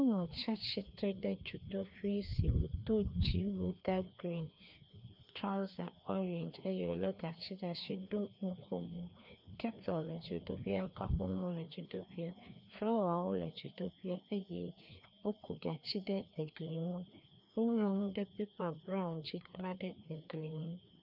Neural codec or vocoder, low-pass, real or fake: codec, 16 kHz, 2 kbps, FreqCodec, larger model; 5.4 kHz; fake